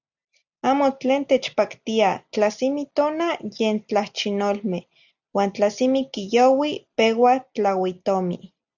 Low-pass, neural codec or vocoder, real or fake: 7.2 kHz; none; real